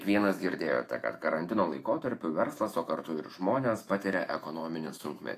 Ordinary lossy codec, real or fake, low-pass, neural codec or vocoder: AAC, 48 kbps; fake; 14.4 kHz; autoencoder, 48 kHz, 128 numbers a frame, DAC-VAE, trained on Japanese speech